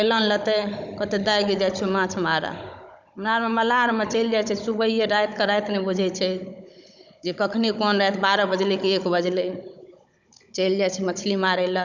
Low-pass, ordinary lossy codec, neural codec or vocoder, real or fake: 7.2 kHz; none; codec, 16 kHz, 16 kbps, FunCodec, trained on Chinese and English, 50 frames a second; fake